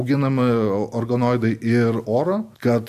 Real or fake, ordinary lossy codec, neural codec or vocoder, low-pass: real; AAC, 96 kbps; none; 14.4 kHz